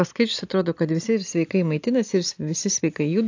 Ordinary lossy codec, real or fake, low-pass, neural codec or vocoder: AAC, 48 kbps; real; 7.2 kHz; none